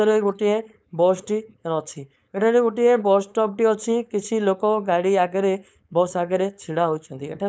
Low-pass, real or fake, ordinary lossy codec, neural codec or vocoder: none; fake; none; codec, 16 kHz, 4.8 kbps, FACodec